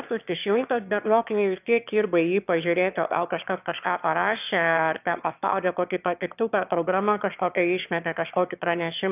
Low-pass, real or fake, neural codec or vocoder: 3.6 kHz; fake; autoencoder, 22.05 kHz, a latent of 192 numbers a frame, VITS, trained on one speaker